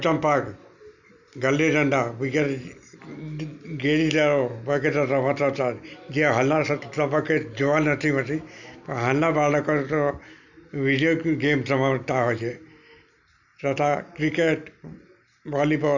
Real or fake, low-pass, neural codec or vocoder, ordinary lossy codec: real; 7.2 kHz; none; none